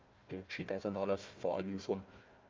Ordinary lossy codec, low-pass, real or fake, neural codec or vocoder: Opus, 32 kbps; 7.2 kHz; fake; codec, 16 kHz, 1 kbps, FunCodec, trained on Chinese and English, 50 frames a second